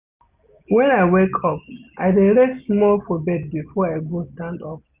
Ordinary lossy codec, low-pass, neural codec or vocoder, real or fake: Opus, 64 kbps; 3.6 kHz; none; real